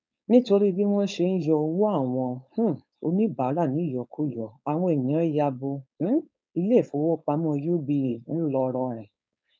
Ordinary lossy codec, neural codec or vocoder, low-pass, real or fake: none; codec, 16 kHz, 4.8 kbps, FACodec; none; fake